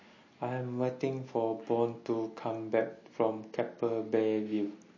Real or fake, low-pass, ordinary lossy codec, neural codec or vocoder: real; 7.2 kHz; MP3, 32 kbps; none